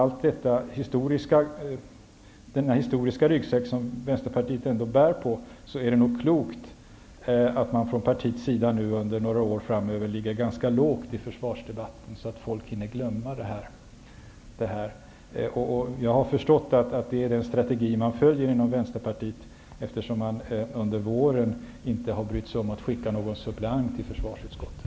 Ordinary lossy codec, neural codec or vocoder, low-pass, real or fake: none; none; none; real